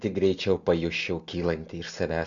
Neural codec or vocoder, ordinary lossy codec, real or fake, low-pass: none; Opus, 64 kbps; real; 7.2 kHz